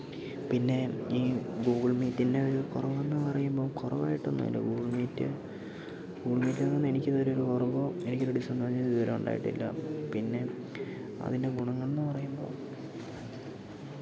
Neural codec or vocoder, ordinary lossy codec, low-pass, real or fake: none; none; none; real